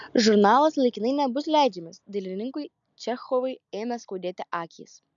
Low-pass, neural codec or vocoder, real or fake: 7.2 kHz; none; real